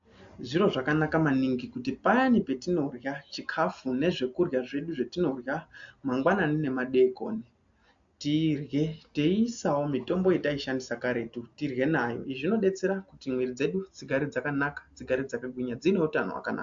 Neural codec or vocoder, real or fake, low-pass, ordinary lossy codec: none; real; 7.2 kHz; AAC, 64 kbps